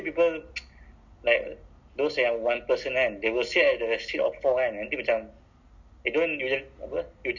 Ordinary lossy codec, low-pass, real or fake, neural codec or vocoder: none; 7.2 kHz; real; none